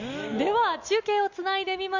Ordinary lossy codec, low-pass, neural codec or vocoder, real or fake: none; 7.2 kHz; none; real